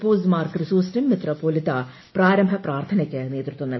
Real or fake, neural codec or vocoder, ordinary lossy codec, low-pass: real; none; MP3, 24 kbps; 7.2 kHz